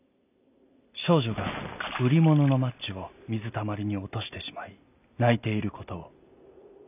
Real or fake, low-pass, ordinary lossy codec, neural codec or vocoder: real; 3.6 kHz; none; none